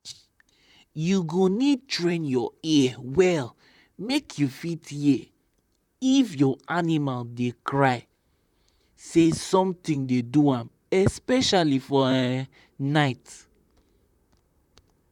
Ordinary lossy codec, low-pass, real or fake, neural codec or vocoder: none; 19.8 kHz; fake; vocoder, 44.1 kHz, 128 mel bands, Pupu-Vocoder